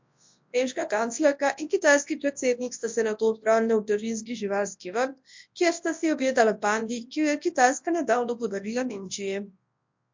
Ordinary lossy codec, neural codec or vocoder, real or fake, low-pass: MP3, 48 kbps; codec, 24 kHz, 0.9 kbps, WavTokenizer, large speech release; fake; 7.2 kHz